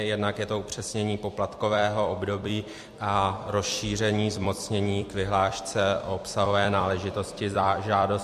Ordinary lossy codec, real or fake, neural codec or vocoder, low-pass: MP3, 64 kbps; fake; vocoder, 44.1 kHz, 128 mel bands every 256 samples, BigVGAN v2; 14.4 kHz